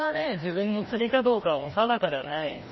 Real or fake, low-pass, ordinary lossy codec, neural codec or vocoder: fake; 7.2 kHz; MP3, 24 kbps; codec, 16 kHz, 1 kbps, FreqCodec, larger model